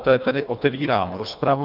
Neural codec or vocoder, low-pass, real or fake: codec, 24 kHz, 1.5 kbps, HILCodec; 5.4 kHz; fake